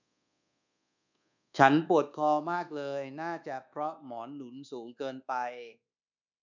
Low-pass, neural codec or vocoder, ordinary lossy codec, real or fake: 7.2 kHz; codec, 24 kHz, 1.2 kbps, DualCodec; none; fake